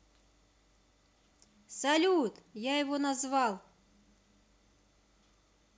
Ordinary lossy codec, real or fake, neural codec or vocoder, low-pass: none; real; none; none